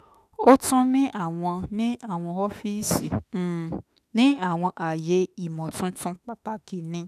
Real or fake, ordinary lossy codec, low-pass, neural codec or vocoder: fake; none; 14.4 kHz; autoencoder, 48 kHz, 32 numbers a frame, DAC-VAE, trained on Japanese speech